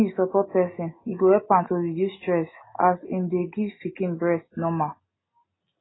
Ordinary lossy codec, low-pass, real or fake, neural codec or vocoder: AAC, 16 kbps; 7.2 kHz; real; none